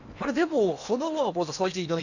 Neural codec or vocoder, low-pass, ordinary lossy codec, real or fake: codec, 16 kHz in and 24 kHz out, 0.8 kbps, FocalCodec, streaming, 65536 codes; 7.2 kHz; none; fake